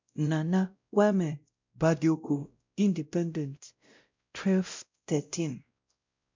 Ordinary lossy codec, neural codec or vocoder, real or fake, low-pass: MP3, 64 kbps; codec, 16 kHz, 0.5 kbps, X-Codec, WavLM features, trained on Multilingual LibriSpeech; fake; 7.2 kHz